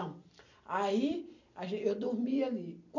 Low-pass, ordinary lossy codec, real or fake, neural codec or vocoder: 7.2 kHz; none; real; none